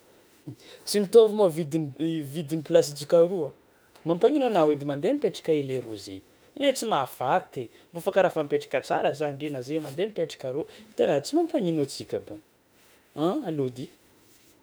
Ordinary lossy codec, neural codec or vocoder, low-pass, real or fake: none; autoencoder, 48 kHz, 32 numbers a frame, DAC-VAE, trained on Japanese speech; none; fake